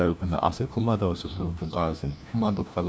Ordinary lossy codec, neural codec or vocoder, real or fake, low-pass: none; codec, 16 kHz, 1 kbps, FunCodec, trained on LibriTTS, 50 frames a second; fake; none